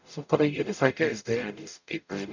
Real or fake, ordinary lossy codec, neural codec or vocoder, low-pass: fake; none; codec, 44.1 kHz, 0.9 kbps, DAC; 7.2 kHz